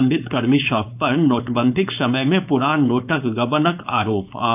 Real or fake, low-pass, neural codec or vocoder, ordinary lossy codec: fake; 3.6 kHz; codec, 16 kHz, 4.8 kbps, FACodec; AAC, 32 kbps